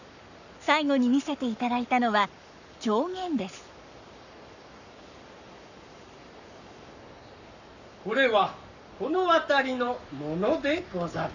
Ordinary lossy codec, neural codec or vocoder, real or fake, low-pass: none; codec, 44.1 kHz, 7.8 kbps, Pupu-Codec; fake; 7.2 kHz